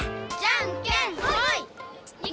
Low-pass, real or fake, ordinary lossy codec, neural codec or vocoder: none; real; none; none